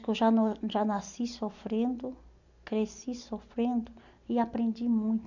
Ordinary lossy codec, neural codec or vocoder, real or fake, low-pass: none; none; real; 7.2 kHz